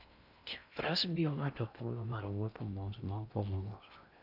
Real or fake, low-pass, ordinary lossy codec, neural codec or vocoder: fake; 5.4 kHz; none; codec, 16 kHz in and 24 kHz out, 0.6 kbps, FocalCodec, streaming, 4096 codes